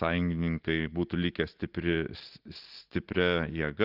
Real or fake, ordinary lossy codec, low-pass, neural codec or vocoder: real; Opus, 16 kbps; 5.4 kHz; none